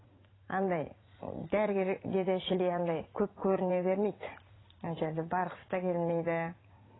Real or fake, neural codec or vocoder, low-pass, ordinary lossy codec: fake; vocoder, 44.1 kHz, 80 mel bands, Vocos; 7.2 kHz; AAC, 16 kbps